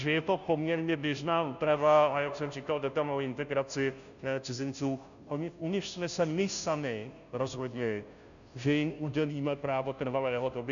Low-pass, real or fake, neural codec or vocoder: 7.2 kHz; fake; codec, 16 kHz, 0.5 kbps, FunCodec, trained on Chinese and English, 25 frames a second